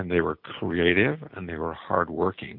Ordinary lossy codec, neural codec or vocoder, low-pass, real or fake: MP3, 48 kbps; none; 5.4 kHz; real